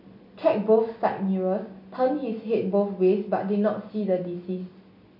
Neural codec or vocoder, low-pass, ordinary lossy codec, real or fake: none; 5.4 kHz; none; real